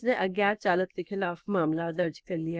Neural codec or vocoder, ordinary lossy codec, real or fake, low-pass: codec, 16 kHz, about 1 kbps, DyCAST, with the encoder's durations; none; fake; none